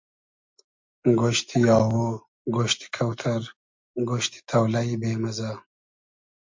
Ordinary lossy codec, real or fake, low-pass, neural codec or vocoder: MP3, 48 kbps; real; 7.2 kHz; none